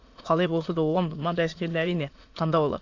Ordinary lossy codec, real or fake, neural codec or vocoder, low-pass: AAC, 48 kbps; fake; autoencoder, 22.05 kHz, a latent of 192 numbers a frame, VITS, trained on many speakers; 7.2 kHz